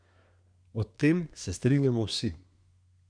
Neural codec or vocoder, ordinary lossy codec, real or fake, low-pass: codec, 24 kHz, 1 kbps, SNAC; none; fake; 9.9 kHz